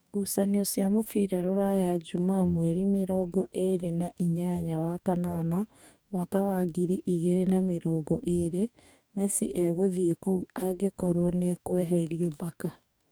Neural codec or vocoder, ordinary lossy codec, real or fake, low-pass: codec, 44.1 kHz, 2.6 kbps, DAC; none; fake; none